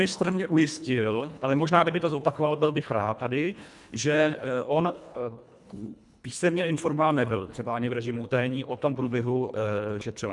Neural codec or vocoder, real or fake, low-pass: codec, 24 kHz, 1.5 kbps, HILCodec; fake; 10.8 kHz